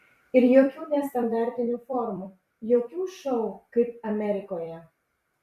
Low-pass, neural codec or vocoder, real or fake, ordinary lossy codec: 14.4 kHz; vocoder, 44.1 kHz, 128 mel bands every 256 samples, BigVGAN v2; fake; Opus, 64 kbps